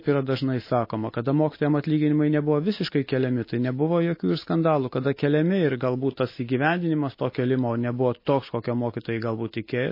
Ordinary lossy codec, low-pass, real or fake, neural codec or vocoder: MP3, 24 kbps; 5.4 kHz; real; none